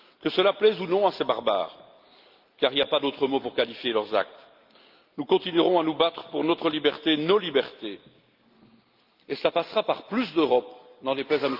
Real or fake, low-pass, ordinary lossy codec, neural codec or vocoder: real; 5.4 kHz; Opus, 32 kbps; none